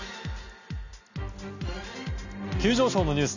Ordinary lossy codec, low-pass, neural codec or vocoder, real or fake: none; 7.2 kHz; none; real